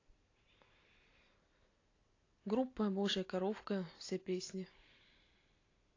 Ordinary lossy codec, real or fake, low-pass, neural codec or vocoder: AAC, 32 kbps; real; 7.2 kHz; none